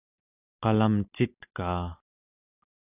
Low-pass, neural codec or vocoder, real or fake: 3.6 kHz; none; real